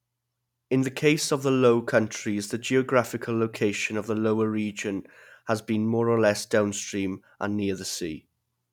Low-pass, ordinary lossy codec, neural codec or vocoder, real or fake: 19.8 kHz; none; none; real